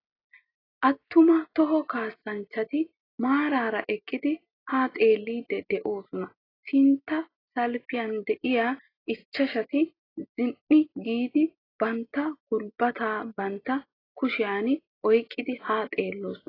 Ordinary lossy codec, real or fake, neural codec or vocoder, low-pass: AAC, 24 kbps; real; none; 5.4 kHz